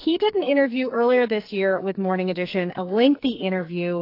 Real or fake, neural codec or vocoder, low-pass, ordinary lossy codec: fake; codec, 44.1 kHz, 2.6 kbps, SNAC; 5.4 kHz; AAC, 32 kbps